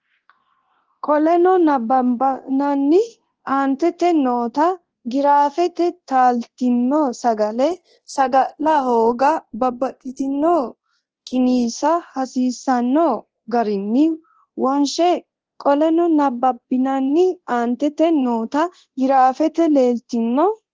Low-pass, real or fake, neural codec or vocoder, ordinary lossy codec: 7.2 kHz; fake; codec, 24 kHz, 0.9 kbps, DualCodec; Opus, 16 kbps